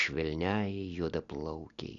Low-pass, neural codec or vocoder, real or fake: 7.2 kHz; none; real